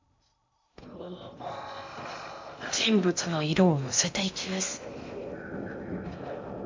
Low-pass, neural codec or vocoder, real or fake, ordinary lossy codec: 7.2 kHz; codec, 16 kHz in and 24 kHz out, 0.6 kbps, FocalCodec, streaming, 2048 codes; fake; MP3, 48 kbps